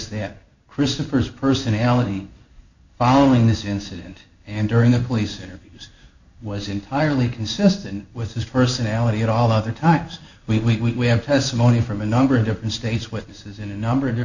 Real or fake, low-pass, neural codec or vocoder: fake; 7.2 kHz; codec, 16 kHz in and 24 kHz out, 1 kbps, XY-Tokenizer